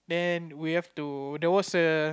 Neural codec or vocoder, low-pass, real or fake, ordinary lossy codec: none; none; real; none